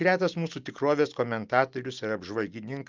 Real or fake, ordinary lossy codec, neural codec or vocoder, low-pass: real; Opus, 24 kbps; none; 7.2 kHz